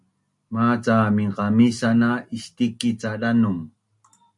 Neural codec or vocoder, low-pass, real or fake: none; 10.8 kHz; real